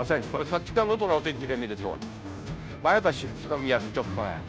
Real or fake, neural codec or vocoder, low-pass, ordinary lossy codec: fake; codec, 16 kHz, 0.5 kbps, FunCodec, trained on Chinese and English, 25 frames a second; none; none